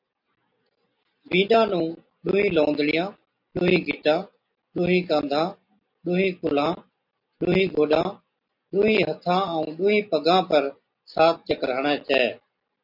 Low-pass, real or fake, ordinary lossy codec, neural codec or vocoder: 5.4 kHz; real; MP3, 48 kbps; none